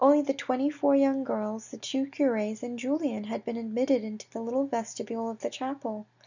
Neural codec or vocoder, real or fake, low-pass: none; real; 7.2 kHz